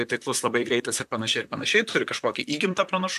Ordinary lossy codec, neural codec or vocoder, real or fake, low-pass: AAC, 96 kbps; vocoder, 44.1 kHz, 128 mel bands, Pupu-Vocoder; fake; 14.4 kHz